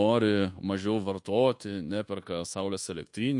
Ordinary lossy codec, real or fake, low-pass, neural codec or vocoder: MP3, 48 kbps; fake; 10.8 kHz; codec, 24 kHz, 1.2 kbps, DualCodec